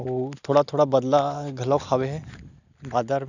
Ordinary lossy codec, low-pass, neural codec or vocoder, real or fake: none; 7.2 kHz; none; real